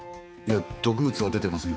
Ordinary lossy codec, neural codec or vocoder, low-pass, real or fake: none; codec, 16 kHz, 4 kbps, X-Codec, HuBERT features, trained on balanced general audio; none; fake